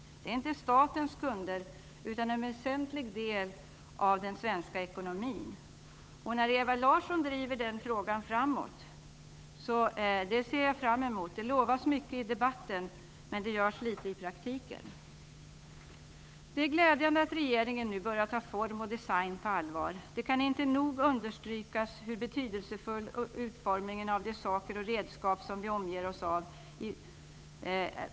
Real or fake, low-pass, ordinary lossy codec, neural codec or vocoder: fake; none; none; codec, 16 kHz, 8 kbps, FunCodec, trained on Chinese and English, 25 frames a second